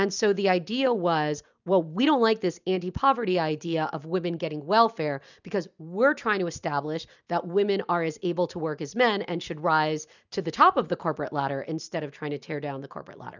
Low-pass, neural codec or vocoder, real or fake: 7.2 kHz; none; real